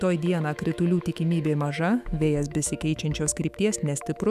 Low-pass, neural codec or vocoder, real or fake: 14.4 kHz; autoencoder, 48 kHz, 128 numbers a frame, DAC-VAE, trained on Japanese speech; fake